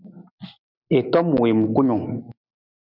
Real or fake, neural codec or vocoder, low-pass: real; none; 5.4 kHz